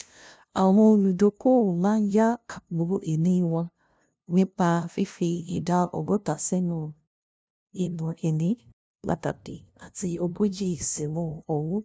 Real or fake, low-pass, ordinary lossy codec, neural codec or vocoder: fake; none; none; codec, 16 kHz, 0.5 kbps, FunCodec, trained on LibriTTS, 25 frames a second